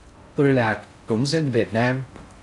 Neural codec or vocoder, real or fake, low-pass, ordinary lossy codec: codec, 16 kHz in and 24 kHz out, 0.6 kbps, FocalCodec, streaming, 4096 codes; fake; 10.8 kHz; AAC, 64 kbps